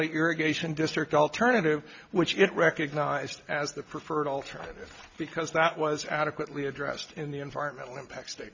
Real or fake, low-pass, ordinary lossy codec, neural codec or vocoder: real; 7.2 kHz; MP3, 64 kbps; none